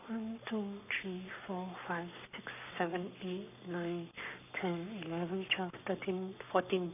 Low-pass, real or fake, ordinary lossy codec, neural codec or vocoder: 3.6 kHz; fake; none; codec, 44.1 kHz, 7.8 kbps, Pupu-Codec